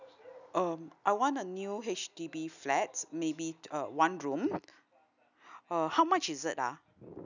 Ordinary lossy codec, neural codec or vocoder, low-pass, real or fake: none; none; 7.2 kHz; real